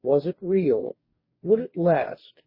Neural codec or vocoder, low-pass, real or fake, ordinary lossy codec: codec, 44.1 kHz, 2.6 kbps, DAC; 5.4 kHz; fake; MP3, 24 kbps